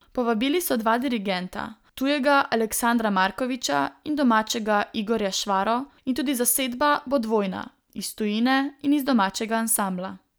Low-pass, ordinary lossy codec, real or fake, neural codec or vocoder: none; none; real; none